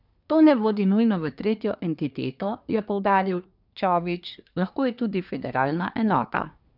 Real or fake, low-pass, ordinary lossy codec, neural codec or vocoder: fake; 5.4 kHz; none; codec, 24 kHz, 1 kbps, SNAC